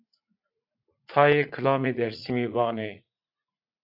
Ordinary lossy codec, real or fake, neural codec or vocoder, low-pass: AAC, 48 kbps; fake; vocoder, 44.1 kHz, 80 mel bands, Vocos; 5.4 kHz